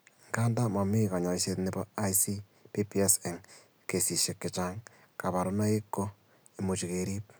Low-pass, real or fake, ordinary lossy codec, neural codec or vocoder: none; real; none; none